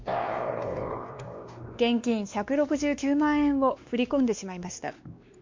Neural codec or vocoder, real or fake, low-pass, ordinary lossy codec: codec, 16 kHz, 2 kbps, X-Codec, WavLM features, trained on Multilingual LibriSpeech; fake; 7.2 kHz; MP3, 48 kbps